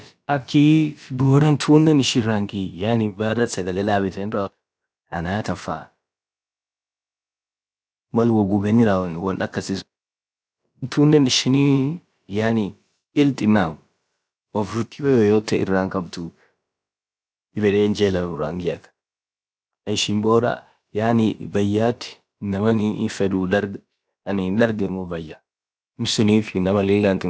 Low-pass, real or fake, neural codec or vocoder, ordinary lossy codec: none; fake; codec, 16 kHz, about 1 kbps, DyCAST, with the encoder's durations; none